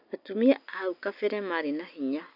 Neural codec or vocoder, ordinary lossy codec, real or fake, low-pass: none; none; real; 5.4 kHz